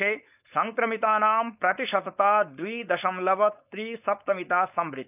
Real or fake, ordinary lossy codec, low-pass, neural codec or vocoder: fake; none; 3.6 kHz; codec, 16 kHz, 4.8 kbps, FACodec